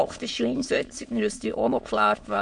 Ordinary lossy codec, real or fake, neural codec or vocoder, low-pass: MP3, 48 kbps; fake; autoencoder, 22.05 kHz, a latent of 192 numbers a frame, VITS, trained on many speakers; 9.9 kHz